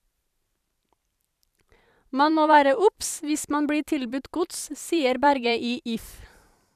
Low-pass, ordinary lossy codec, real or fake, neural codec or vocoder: 14.4 kHz; none; fake; vocoder, 44.1 kHz, 128 mel bands every 512 samples, BigVGAN v2